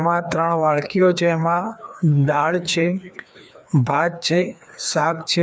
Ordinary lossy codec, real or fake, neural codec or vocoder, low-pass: none; fake; codec, 16 kHz, 2 kbps, FreqCodec, larger model; none